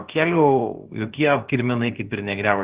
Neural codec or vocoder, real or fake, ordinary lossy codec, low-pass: codec, 16 kHz, about 1 kbps, DyCAST, with the encoder's durations; fake; Opus, 16 kbps; 3.6 kHz